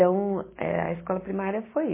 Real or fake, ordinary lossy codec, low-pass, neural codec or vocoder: real; MP3, 16 kbps; 3.6 kHz; none